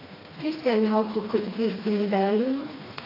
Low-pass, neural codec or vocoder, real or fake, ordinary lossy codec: 5.4 kHz; codec, 16 kHz, 2 kbps, FreqCodec, smaller model; fake; AAC, 24 kbps